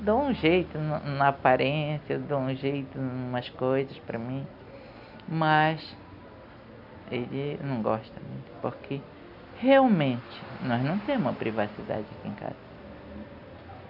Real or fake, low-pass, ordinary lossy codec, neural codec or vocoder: real; 5.4 kHz; none; none